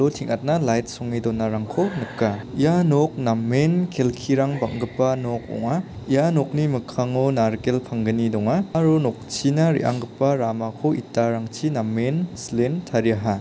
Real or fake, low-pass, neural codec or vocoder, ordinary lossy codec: real; none; none; none